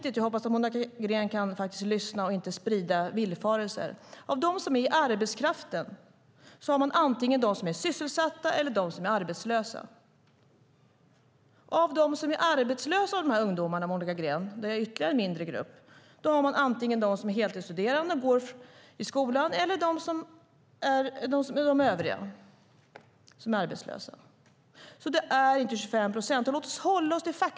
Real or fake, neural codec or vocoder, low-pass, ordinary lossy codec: real; none; none; none